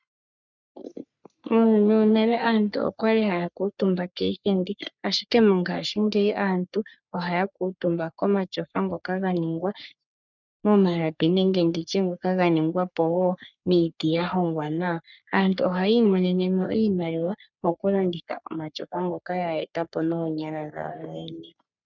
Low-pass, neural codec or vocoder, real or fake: 7.2 kHz; codec, 44.1 kHz, 3.4 kbps, Pupu-Codec; fake